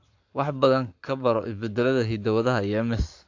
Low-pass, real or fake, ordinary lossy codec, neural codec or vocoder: 7.2 kHz; fake; AAC, 48 kbps; codec, 44.1 kHz, 7.8 kbps, Pupu-Codec